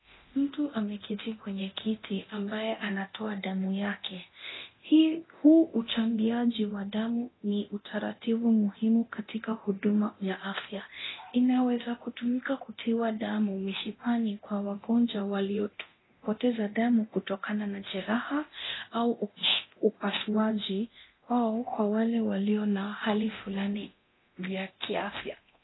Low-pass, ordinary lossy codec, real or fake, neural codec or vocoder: 7.2 kHz; AAC, 16 kbps; fake; codec, 24 kHz, 0.9 kbps, DualCodec